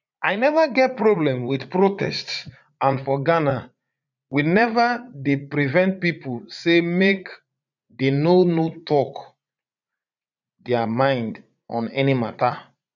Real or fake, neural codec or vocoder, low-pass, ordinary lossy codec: fake; vocoder, 44.1 kHz, 80 mel bands, Vocos; 7.2 kHz; none